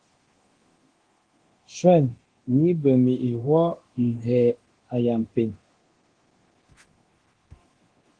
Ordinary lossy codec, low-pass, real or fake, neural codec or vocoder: Opus, 16 kbps; 9.9 kHz; fake; codec, 24 kHz, 0.9 kbps, DualCodec